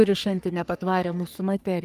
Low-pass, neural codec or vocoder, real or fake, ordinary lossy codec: 14.4 kHz; codec, 44.1 kHz, 3.4 kbps, Pupu-Codec; fake; Opus, 32 kbps